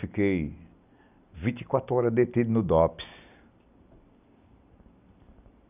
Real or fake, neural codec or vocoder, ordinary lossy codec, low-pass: real; none; none; 3.6 kHz